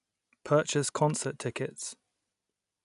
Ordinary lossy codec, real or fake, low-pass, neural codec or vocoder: none; real; 10.8 kHz; none